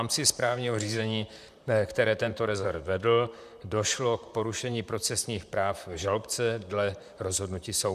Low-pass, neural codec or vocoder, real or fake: 14.4 kHz; vocoder, 44.1 kHz, 128 mel bands, Pupu-Vocoder; fake